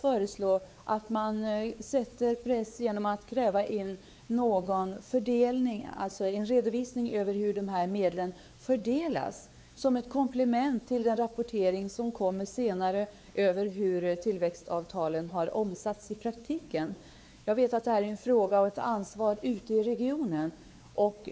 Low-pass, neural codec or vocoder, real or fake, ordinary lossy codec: none; codec, 16 kHz, 4 kbps, X-Codec, WavLM features, trained on Multilingual LibriSpeech; fake; none